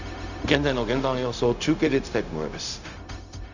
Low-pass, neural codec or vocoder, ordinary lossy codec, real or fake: 7.2 kHz; codec, 16 kHz, 0.4 kbps, LongCat-Audio-Codec; none; fake